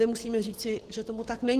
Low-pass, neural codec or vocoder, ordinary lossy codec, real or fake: 14.4 kHz; codec, 44.1 kHz, 7.8 kbps, Pupu-Codec; Opus, 16 kbps; fake